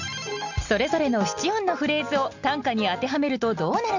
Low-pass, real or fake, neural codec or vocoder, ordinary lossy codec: 7.2 kHz; real; none; none